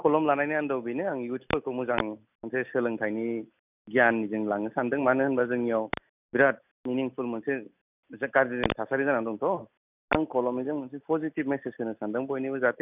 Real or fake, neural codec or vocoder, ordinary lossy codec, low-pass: real; none; none; 3.6 kHz